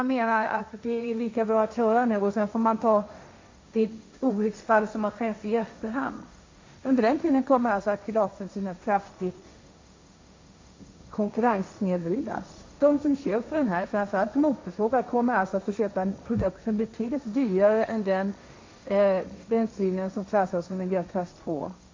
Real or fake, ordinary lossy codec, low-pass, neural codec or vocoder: fake; MP3, 48 kbps; 7.2 kHz; codec, 16 kHz, 1.1 kbps, Voila-Tokenizer